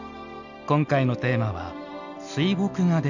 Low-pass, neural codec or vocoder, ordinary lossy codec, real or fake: 7.2 kHz; none; none; real